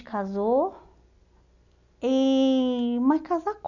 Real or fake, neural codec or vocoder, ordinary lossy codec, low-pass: real; none; none; 7.2 kHz